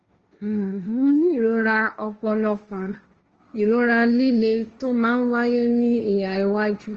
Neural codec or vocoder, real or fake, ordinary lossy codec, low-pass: codec, 16 kHz, 1.1 kbps, Voila-Tokenizer; fake; Opus, 24 kbps; 7.2 kHz